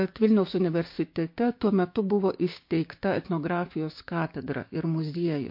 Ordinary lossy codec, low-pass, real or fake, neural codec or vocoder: MP3, 32 kbps; 5.4 kHz; fake; codec, 16 kHz, 6 kbps, DAC